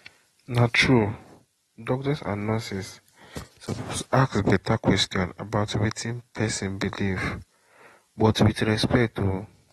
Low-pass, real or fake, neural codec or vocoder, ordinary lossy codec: 19.8 kHz; real; none; AAC, 32 kbps